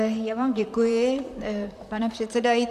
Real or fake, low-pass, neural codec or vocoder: fake; 14.4 kHz; vocoder, 44.1 kHz, 128 mel bands, Pupu-Vocoder